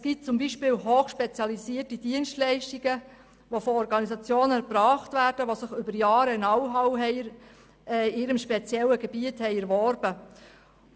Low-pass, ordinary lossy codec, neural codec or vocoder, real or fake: none; none; none; real